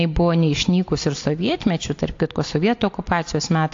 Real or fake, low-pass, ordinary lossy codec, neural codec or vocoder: real; 7.2 kHz; AAC, 48 kbps; none